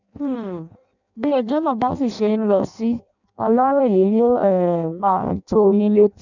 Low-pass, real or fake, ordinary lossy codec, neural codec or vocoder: 7.2 kHz; fake; none; codec, 16 kHz in and 24 kHz out, 0.6 kbps, FireRedTTS-2 codec